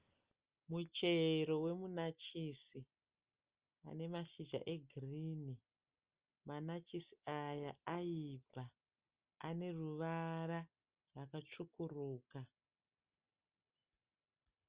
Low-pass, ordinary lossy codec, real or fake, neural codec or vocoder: 3.6 kHz; Opus, 32 kbps; real; none